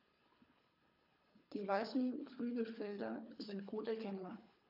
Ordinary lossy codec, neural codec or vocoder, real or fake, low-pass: AAC, 48 kbps; codec, 24 kHz, 3 kbps, HILCodec; fake; 5.4 kHz